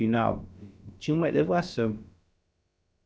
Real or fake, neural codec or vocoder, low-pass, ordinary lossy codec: fake; codec, 16 kHz, about 1 kbps, DyCAST, with the encoder's durations; none; none